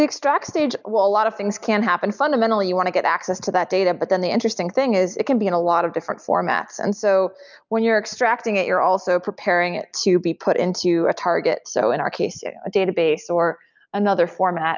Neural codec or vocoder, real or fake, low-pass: none; real; 7.2 kHz